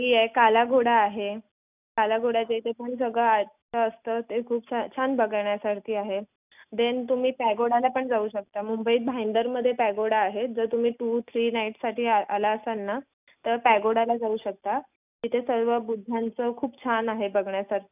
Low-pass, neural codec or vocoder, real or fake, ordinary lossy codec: 3.6 kHz; none; real; none